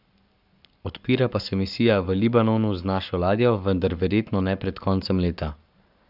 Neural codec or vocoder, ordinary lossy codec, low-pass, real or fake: codec, 44.1 kHz, 7.8 kbps, Pupu-Codec; none; 5.4 kHz; fake